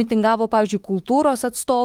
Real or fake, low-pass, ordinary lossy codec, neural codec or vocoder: fake; 19.8 kHz; Opus, 24 kbps; autoencoder, 48 kHz, 32 numbers a frame, DAC-VAE, trained on Japanese speech